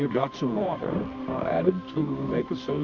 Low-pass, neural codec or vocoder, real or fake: 7.2 kHz; codec, 24 kHz, 0.9 kbps, WavTokenizer, medium music audio release; fake